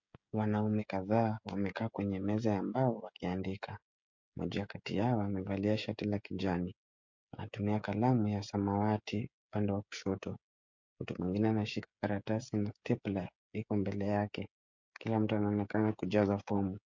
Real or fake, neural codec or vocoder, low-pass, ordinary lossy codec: fake; codec, 16 kHz, 16 kbps, FreqCodec, smaller model; 7.2 kHz; MP3, 48 kbps